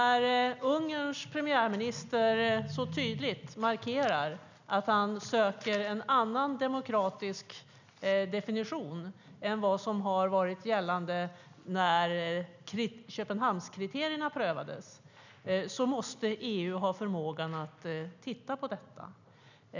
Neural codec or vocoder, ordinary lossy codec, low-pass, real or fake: none; none; 7.2 kHz; real